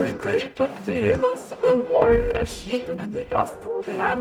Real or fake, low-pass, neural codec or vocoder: fake; 19.8 kHz; codec, 44.1 kHz, 0.9 kbps, DAC